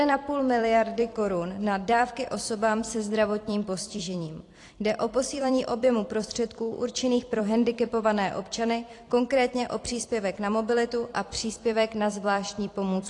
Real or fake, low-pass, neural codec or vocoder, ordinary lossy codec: fake; 10.8 kHz; vocoder, 44.1 kHz, 128 mel bands every 256 samples, BigVGAN v2; AAC, 48 kbps